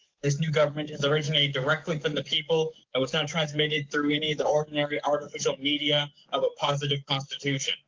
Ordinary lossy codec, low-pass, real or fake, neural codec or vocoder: Opus, 32 kbps; 7.2 kHz; fake; codec, 44.1 kHz, 7.8 kbps, DAC